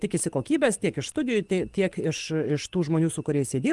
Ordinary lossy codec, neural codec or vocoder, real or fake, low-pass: Opus, 24 kbps; codec, 44.1 kHz, 7.8 kbps, DAC; fake; 10.8 kHz